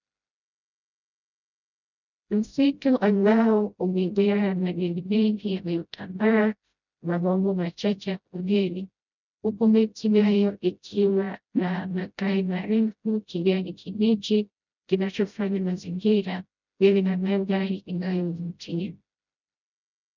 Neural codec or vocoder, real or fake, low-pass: codec, 16 kHz, 0.5 kbps, FreqCodec, smaller model; fake; 7.2 kHz